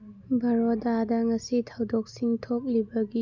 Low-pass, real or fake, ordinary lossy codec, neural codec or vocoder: 7.2 kHz; real; none; none